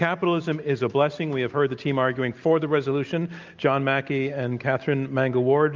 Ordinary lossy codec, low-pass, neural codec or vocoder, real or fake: Opus, 24 kbps; 7.2 kHz; none; real